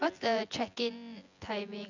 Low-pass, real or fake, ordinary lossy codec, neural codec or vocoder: 7.2 kHz; fake; none; vocoder, 24 kHz, 100 mel bands, Vocos